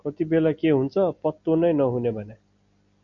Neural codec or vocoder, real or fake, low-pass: none; real; 7.2 kHz